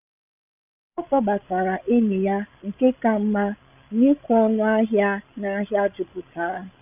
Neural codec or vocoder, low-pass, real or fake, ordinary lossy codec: codec, 16 kHz, 16 kbps, FreqCodec, larger model; 3.6 kHz; fake; none